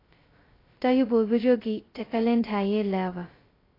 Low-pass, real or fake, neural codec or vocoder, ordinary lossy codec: 5.4 kHz; fake; codec, 16 kHz, 0.2 kbps, FocalCodec; AAC, 24 kbps